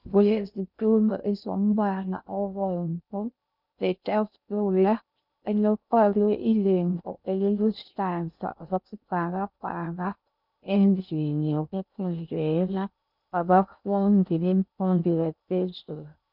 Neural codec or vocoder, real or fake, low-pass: codec, 16 kHz in and 24 kHz out, 0.6 kbps, FocalCodec, streaming, 2048 codes; fake; 5.4 kHz